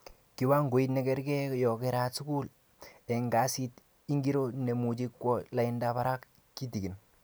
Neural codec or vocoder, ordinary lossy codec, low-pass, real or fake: none; none; none; real